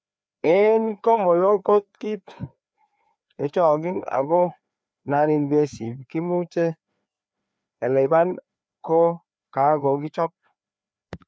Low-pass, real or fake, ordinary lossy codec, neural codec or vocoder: none; fake; none; codec, 16 kHz, 2 kbps, FreqCodec, larger model